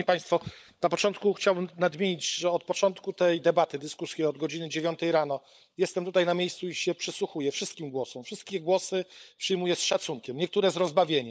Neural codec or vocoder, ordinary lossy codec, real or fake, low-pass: codec, 16 kHz, 16 kbps, FunCodec, trained on LibriTTS, 50 frames a second; none; fake; none